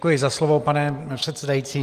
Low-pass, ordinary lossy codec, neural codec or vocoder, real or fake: 14.4 kHz; Opus, 32 kbps; none; real